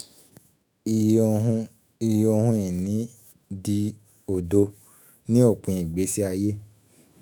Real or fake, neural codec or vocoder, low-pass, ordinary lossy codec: fake; autoencoder, 48 kHz, 128 numbers a frame, DAC-VAE, trained on Japanese speech; none; none